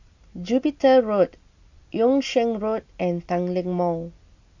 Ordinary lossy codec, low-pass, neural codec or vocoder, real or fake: AAC, 48 kbps; 7.2 kHz; none; real